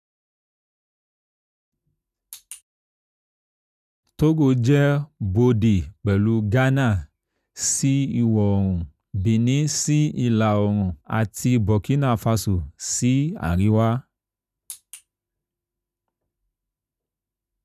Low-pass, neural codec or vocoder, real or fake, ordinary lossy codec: 14.4 kHz; none; real; none